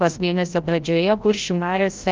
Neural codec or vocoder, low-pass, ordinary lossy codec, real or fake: codec, 16 kHz, 0.5 kbps, FreqCodec, larger model; 7.2 kHz; Opus, 24 kbps; fake